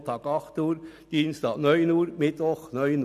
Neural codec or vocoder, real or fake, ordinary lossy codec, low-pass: none; real; none; 14.4 kHz